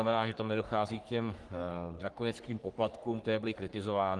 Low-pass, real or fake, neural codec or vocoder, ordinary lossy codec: 10.8 kHz; fake; codec, 44.1 kHz, 3.4 kbps, Pupu-Codec; Opus, 24 kbps